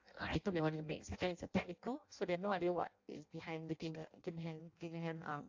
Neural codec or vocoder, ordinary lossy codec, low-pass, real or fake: codec, 16 kHz in and 24 kHz out, 0.6 kbps, FireRedTTS-2 codec; AAC, 48 kbps; 7.2 kHz; fake